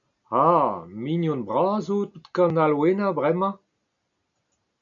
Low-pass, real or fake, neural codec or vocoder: 7.2 kHz; real; none